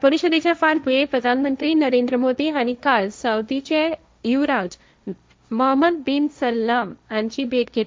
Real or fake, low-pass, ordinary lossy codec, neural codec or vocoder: fake; none; none; codec, 16 kHz, 1.1 kbps, Voila-Tokenizer